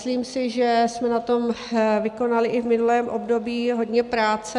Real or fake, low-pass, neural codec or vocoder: real; 10.8 kHz; none